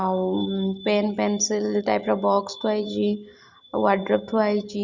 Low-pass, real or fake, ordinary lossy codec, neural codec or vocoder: 7.2 kHz; real; Opus, 64 kbps; none